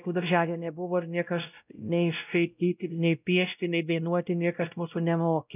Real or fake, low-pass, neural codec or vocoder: fake; 3.6 kHz; codec, 16 kHz, 0.5 kbps, X-Codec, WavLM features, trained on Multilingual LibriSpeech